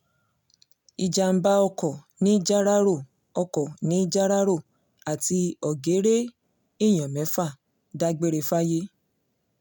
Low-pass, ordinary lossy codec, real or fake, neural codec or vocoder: 19.8 kHz; none; real; none